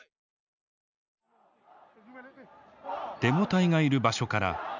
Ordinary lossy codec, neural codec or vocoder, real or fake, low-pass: none; none; real; 7.2 kHz